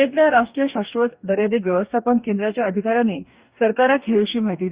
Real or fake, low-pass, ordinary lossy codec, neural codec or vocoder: fake; 3.6 kHz; Opus, 64 kbps; codec, 44.1 kHz, 2.6 kbps, DAC